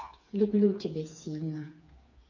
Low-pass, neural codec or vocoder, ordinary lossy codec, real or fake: 7.2 kHz; codec, 16 kHz, 4 kbps, FreqCodec, smaller model; none; fake